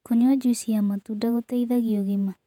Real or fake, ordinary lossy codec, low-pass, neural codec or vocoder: fake; none; 19.8 kHz; vocoder, 48 kHz, 128 mel bands, Vocos